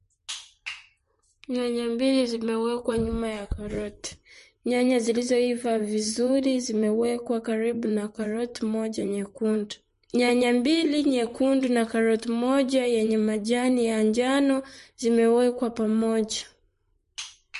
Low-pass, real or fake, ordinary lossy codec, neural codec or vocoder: 14.4 kHz; fake; MP3, 48 kbps; vocoder, 44.1 kHz, 128 mel bands, Pupu-Vocoder